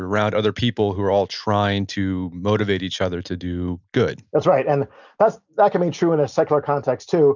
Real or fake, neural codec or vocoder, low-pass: real; none; 7.2 kHz